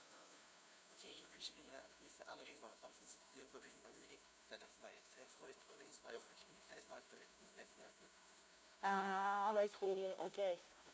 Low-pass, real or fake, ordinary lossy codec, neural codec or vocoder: none; fake; none; codec, 16 kHz, 0.5 kbps, FunCodec, trained on LibriTTS, 25 frames a second